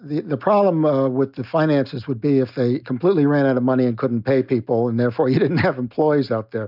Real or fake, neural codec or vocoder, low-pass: real; none; 5.4 kHz